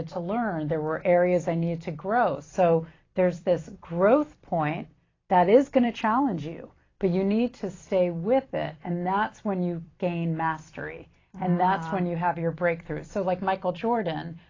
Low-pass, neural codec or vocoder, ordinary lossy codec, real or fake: 7.2 kHz; vocoder, 44.1 kHz, 128 mel bands every 256 samples, BigVGAN v2; AAC, 32 kbps; fake